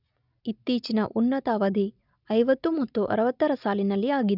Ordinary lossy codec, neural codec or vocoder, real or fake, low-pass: none; none; real; 5.4 kHz